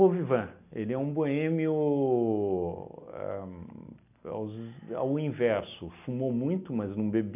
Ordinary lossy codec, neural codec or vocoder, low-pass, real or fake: AAC, 32 kbps; none; 3.6 kHz; real